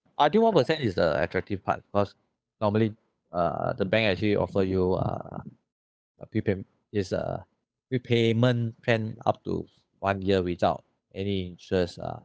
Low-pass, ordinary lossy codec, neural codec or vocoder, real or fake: none; none; codec, 16 kHz, 8 kbps, FunCodec, trained on Chinese and English, 25 frames a second; fake